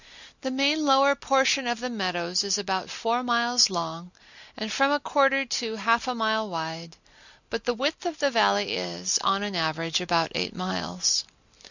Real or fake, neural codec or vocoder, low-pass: real; none; 7.2 kHz